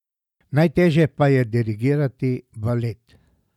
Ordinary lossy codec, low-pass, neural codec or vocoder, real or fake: none; 19.8 kHz; none; real